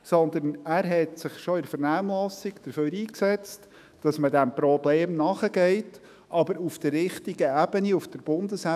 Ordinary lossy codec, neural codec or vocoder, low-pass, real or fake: none; autoencoder, 48 kHz, 128 numbers a frame, DAC-VAE, trained on Japanese speech; 14.4 kHz; fake